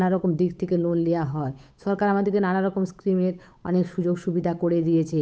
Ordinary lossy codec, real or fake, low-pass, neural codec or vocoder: none; fake; none; codec, 16 kHz, 8 kbps, FunCodec, trained on Chinese and English, 25 frames a second